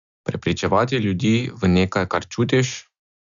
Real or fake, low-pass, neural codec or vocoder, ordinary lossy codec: real; 7.2 kHz; none; none